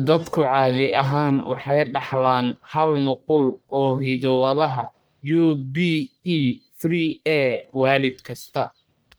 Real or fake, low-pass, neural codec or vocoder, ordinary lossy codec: fake; none; codec, 44.1 kHz, 1.7 kbps, Pupu-Codec; none